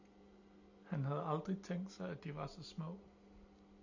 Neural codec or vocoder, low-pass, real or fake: none; 7.2 kHz; real